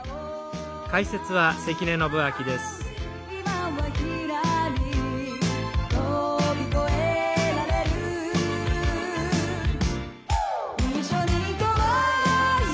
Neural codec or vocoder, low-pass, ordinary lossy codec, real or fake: none; none; none; real